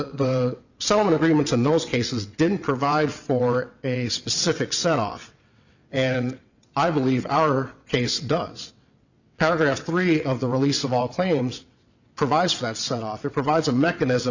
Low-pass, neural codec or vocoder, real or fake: 7.2 kHz; vocoder, 22.05 kHz, 80 mel bands, WaveNeXt; fake